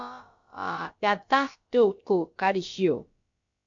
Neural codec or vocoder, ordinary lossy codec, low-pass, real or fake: codec, 16 kHz, about 1 kbps, DyCAST, with the encoder's durations; MP3, 48 kbps; 7.2 kHz; fake